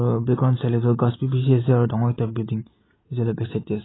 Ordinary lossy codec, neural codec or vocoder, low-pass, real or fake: AAC, 16 kbps; none; 7.2 kHz; real